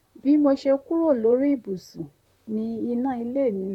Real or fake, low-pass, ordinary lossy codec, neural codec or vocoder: fake; 19.8 kHz; none; vocoder, 44.1 kHz, 128 mel bands, Pupu-Vocoder